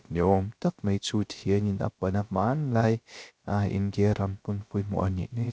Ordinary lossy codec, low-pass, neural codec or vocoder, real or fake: none; none; codec, 16 kHz, 0.3 kbps, FocalCodec; fake